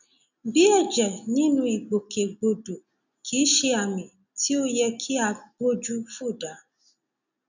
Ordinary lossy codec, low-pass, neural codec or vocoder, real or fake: none; 7.2 kHz; none; real